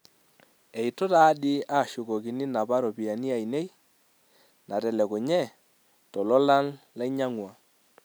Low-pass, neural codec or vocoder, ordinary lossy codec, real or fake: none; none; none; real